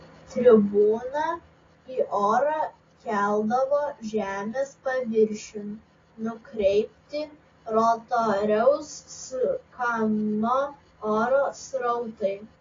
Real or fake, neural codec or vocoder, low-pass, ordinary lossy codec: real; none; 7.2 kHz; AAC, 32 kbps